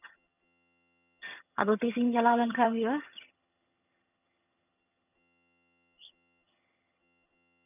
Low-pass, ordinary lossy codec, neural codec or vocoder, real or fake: 3.6 kHz; none; vocoder, 22.05 kHz, 80 mel bands, HiFi-GAN; fake